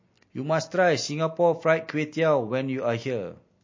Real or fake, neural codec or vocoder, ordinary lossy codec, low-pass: real; none; MP3, 32 kbps; 7.2 kHz